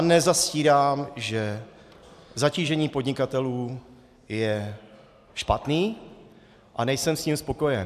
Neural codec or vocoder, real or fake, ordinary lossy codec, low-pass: none; real; AAC, 96 kbps; 14.4 kHz